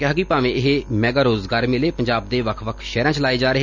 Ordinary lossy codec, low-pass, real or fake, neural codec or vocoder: none; 7.2 kHz; real; none